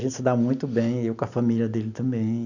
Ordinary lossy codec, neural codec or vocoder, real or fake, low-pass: none; none; real; 7.2 kHz